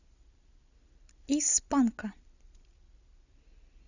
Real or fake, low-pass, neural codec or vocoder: real; 7.2 kHz; none